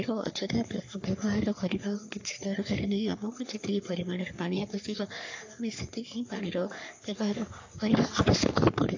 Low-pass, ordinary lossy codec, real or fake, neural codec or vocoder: 7.2 kHz; AAC, 48 kbps; fake; codec, 44.1 kHz, 3.4 kbps, Pupu-Codec